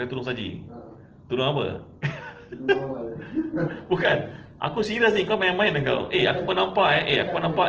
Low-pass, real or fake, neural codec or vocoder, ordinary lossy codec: 7.2 kHz; real; none; Opus, 16 kbps